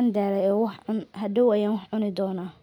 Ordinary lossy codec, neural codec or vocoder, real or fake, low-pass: none; none; real; 19.8 kHz